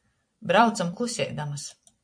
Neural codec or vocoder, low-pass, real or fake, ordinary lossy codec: vocoder, 44.1 kHz, 128 mel bands every 256 samples, BigVGAN v2; 9.9 kHz; fake; MP3, 48 kbps